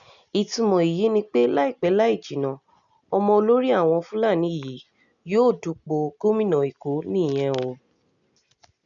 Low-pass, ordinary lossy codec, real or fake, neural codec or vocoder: 7.2 kHz; none; real; none